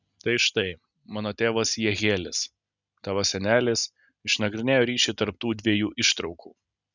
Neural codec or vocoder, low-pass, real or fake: none; 7.2 kHz; real